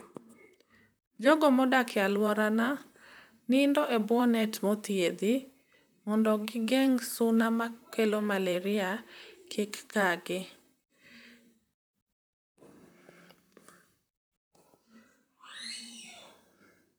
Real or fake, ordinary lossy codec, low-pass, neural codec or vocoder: fake; none; none; vocoder, 44.1 kHz, 128 mel bands, Pupu-Vocoder